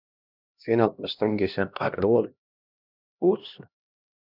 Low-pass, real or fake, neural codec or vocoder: 5.4 kHz; fake; codec, 16 kHz, 1 kbps, X-Codec, HuBERT features, trained on LibriSpeech